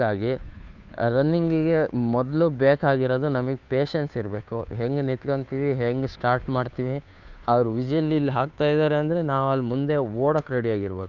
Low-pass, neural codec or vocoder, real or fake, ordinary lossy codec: 7.2 kHz; codec, 16 kHz, 2 kbps, FunCodec, trained on Chinese and English, 25 frames a second; fake; none